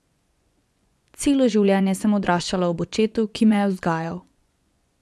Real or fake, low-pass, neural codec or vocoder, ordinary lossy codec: fake; none; vocoder, 24 kHz, 100 mel bands, Vocos; none